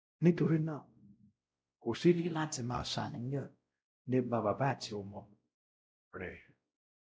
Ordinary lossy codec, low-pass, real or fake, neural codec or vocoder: none; none; fake; codec, 16 kHz, 0.5 kbps, X-Codec, WavLM features, trained on Multilingual LibriSpeech